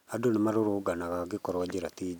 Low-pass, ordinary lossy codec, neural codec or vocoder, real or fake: 19.8 kHz; none; none; real